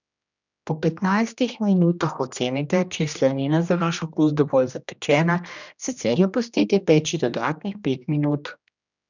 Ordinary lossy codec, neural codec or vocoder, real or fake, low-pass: none; codec, 16 kHz, 1 kbps, X-Codec, HuBERT features, trained on general audio; fake; 7.2 kHz